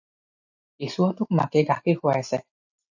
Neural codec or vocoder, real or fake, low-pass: none; real; 7.2 kHz